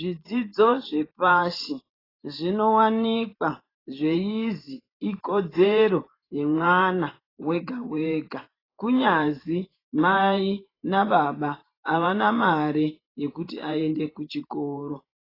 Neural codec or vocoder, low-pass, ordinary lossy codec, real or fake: vocoder, 44.1 kHz, 128 mel bands every 512 samples, BigVGAN v2; 5.4 kHz; AAC, 24 kbps; fake